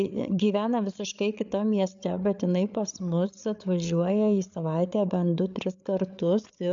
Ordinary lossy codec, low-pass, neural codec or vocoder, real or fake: AAC, 64 kbps; 7.2 kHz; codec, 16 kHz, 8 kbps, FreqCodec, larger model; fake